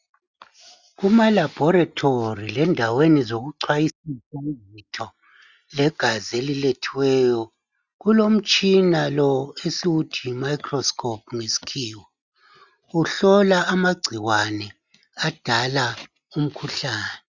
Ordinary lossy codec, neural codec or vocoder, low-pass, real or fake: Opus, 64 kbps; none; 7.2 kHz; real